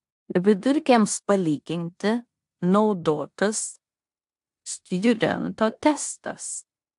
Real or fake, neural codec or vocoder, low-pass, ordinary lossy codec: fake; codec, 16 kHz in and 24 kHz out, 0.9 kbps, LongCat-Audio-Codec, four codebook decoder; 10.8 kHz; AAC, 64 kbps